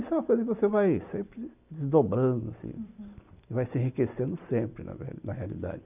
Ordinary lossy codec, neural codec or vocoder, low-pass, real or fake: none; none; 3.6 kHz; real